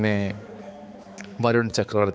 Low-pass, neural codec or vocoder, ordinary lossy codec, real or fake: none; codec, 16 kHz, 4 kbps, X-Codec, HuBERT features, trained on balanced general audio; none; fake